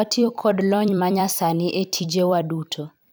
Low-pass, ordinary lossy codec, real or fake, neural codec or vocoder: none; none; fake; vocoder, 44.1 kHz, 128 mel bands every 256 samples, BigVGAN v2